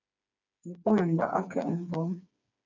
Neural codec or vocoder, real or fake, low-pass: codec, 16 kHz, 4 kbps, FreqCodec, smaller model; fake; 7.2 kHz